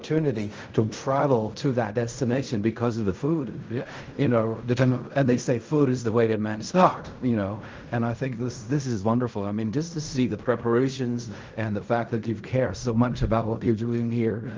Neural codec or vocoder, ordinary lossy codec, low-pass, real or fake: codec, 16 kHz in and 24 kHz out, 0.4 kbps, LongCat-Audio-Codec, fine tuned four codebook decoder; Opus, 24 kbps; 7.2 kHz; fake